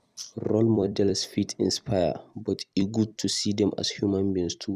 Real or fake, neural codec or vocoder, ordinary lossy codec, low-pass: fake; vocoder, 44.1 kHz, 128 mel bands every 256 samples, BigVGAN v2; none; 14.4 kHz